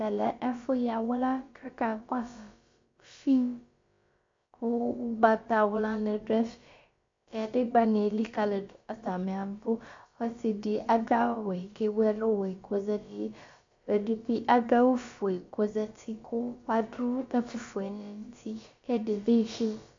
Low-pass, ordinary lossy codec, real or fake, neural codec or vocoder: 7.2 kHz; MP3, 48 kbps; fake; codec, 16 kHz, about 1 kbps, DyCAST, with the encoder's durations